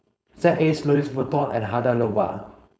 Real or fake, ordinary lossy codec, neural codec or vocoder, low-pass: fake; none; codec, 16 kHz, 4.8 kbps, FACodec; none